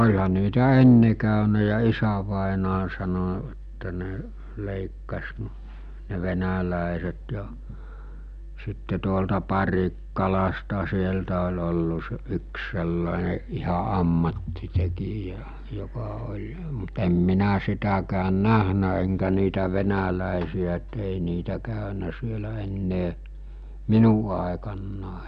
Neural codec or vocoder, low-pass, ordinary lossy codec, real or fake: none; 9.9 kHz; none; real